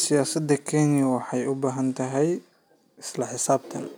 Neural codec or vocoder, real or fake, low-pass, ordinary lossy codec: none; real; none; none